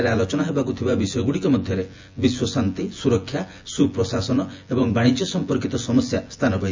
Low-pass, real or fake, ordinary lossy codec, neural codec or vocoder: 7.2 kHz; fake; MP3, 64 kbps; vocoder, 24 kHz, 100 mel bands, Vocos